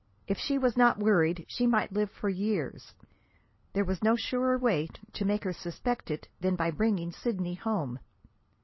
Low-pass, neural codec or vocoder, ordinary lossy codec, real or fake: 7.2 kHz; none; MP3, 24 kbps; real